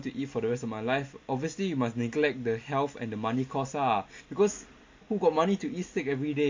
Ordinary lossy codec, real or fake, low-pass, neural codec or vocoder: MP3, 48 kbps; real; 7.2 kHz; none